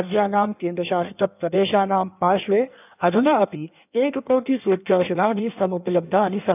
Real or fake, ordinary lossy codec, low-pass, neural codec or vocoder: fake; none; 3.6 kHz; codec, 16 kHz in and 24 kHz out, 1.1 kbps, FireRedTTS-2 codec